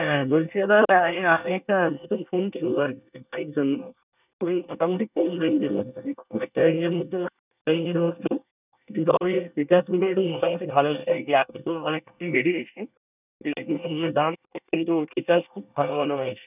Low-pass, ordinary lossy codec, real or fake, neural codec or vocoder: 3.6 kHz; none; fake; codec, 24 kHz, 1 kbps, SNAC